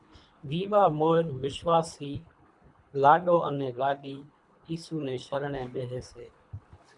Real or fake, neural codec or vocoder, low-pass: fake; codec, 24 kHz, 3 kbps, HILCodec; 10.8 kHz